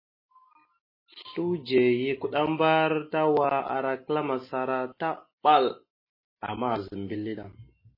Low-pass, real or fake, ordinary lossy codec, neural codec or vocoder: 5.4 kHz; real; MP3, 24 kbps; none